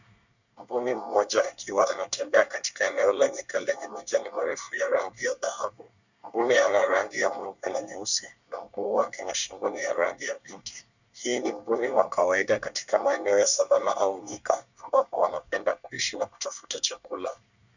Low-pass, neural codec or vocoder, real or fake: 7.2 kHz; codec, 24 kHz, 1 kbps, SNAC; fake